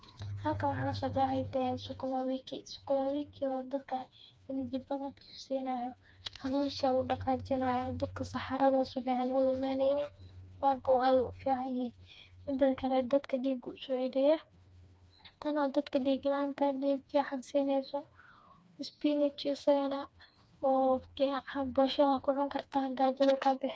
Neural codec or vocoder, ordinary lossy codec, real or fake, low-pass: codec, 16 kHz, 2 kbps, FreqCodec, smaller model; none; fake; none